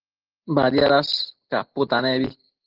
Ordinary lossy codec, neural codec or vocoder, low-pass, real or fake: Opus, 16 kbps; none; 5.4 kHz; real